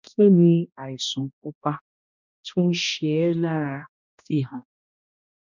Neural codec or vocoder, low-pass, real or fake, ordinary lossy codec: codec, 16 kHz, 1 kbps, X-Codec, HuBERT features, trained on balanced general audio; 7.2 kHz; fake; none